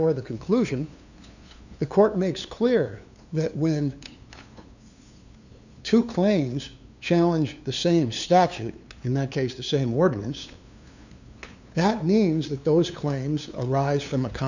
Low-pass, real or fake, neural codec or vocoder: 7.2 kHz; fake; codec, 16 kHz, 2 kbps, FunCodec, trained on LibriTTS, 25 frames a second